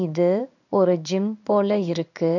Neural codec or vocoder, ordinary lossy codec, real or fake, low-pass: codec, 16 kHz in and 24 kHz out, 1 kbps, XY-Tokenizer; none; fake; 7.2 kHz